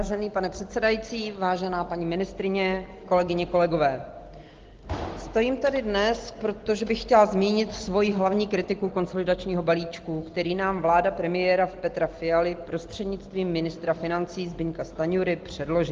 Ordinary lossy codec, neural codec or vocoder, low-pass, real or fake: Opus, 16 kbps; none; 7.2 kHz; real